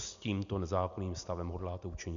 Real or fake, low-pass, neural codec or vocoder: real; 7.2 kHz; none